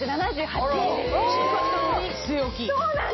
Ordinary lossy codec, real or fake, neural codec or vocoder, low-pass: MP3, 24 kbps; real; none; 7.2 kHz